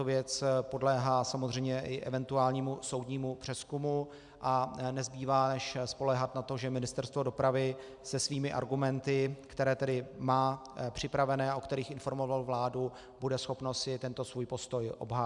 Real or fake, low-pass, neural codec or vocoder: real; 10.8 kHz; none